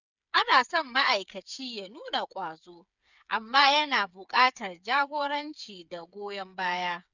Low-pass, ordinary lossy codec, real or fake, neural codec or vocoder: 7.2 kHz; none; fake; codec, 16 kHz, 8 kbps, FreqCodec, smaller model